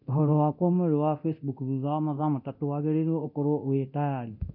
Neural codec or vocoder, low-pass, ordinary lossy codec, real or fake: codec, 24 kHz, 0.9 kbps, DualCodec; 5.4 kHz; none; fake